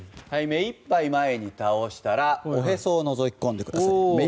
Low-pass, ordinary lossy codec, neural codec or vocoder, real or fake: none; none; none; real